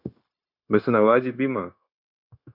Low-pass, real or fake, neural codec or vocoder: 5.4 kHz; fake; codec, 16 kHz, 0.9 kbps, LongCat-Audio-Codec